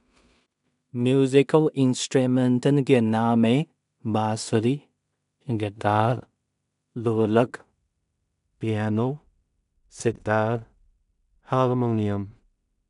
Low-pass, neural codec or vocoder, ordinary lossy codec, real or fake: 10.8 kHz; codec, 16 kHz in and 24 kHz out, 0.4 kbps, LongCat-Audio-Codec, two codebook decoder; none; fake